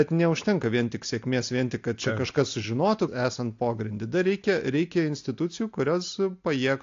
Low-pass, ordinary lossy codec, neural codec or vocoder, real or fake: 7.2 kHz; AAC, 48 kbps; none; real